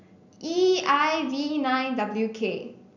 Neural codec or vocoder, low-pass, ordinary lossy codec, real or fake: none; 7.2 kHz; none; real